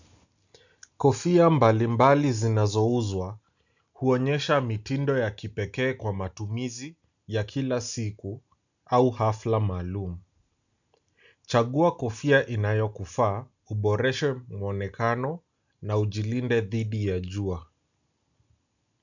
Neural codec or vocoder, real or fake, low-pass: none; real; 7.2 kHz